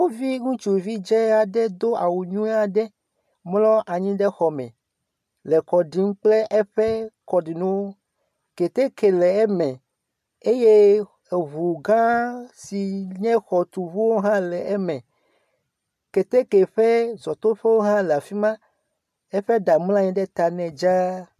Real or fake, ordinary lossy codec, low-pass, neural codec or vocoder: real; MP3, 96 kbps; 14.4 kHz; none